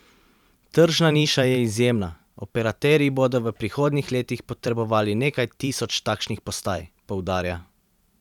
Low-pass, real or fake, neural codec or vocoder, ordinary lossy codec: 19.8 kHz; fake; vocoder, 44.1 kHz, 128 mel bands every 512 samples, BigVGAN v2; none